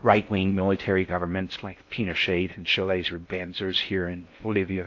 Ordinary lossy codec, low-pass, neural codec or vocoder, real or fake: AAC, 48 kbps; 7.2 kHz; codec, 16 kHz in and 24 kHz out, 0.6 kbps, FocalCodec, streaming, 4096 codes; fake